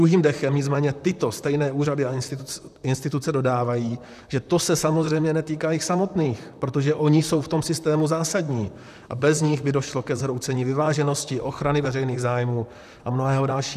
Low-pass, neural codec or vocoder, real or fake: 14.4 kHz; vocoder, 44.1 kHz, 128 mel bands, Pupu-Vocoder; fake